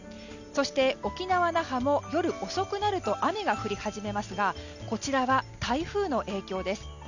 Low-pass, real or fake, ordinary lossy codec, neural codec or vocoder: 7.2 kHz; real; none; none